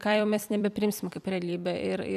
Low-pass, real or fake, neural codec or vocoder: 14.4 kHz; fake; vocoder, 44.1 kHz, 128 mel bands every 256 samples, BigVGAN v2